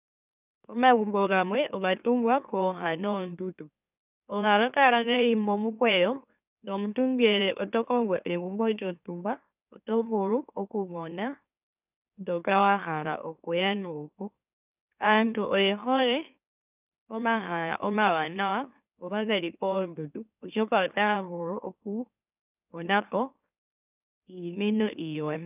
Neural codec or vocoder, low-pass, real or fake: autoencoder, 44.1 kHz, a latent of 192 numbers a frame, MeloTTS; 3.6 kHz; fake